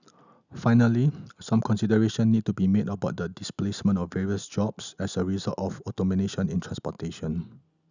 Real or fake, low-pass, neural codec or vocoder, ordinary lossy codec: real; 7.2 kHz; none; none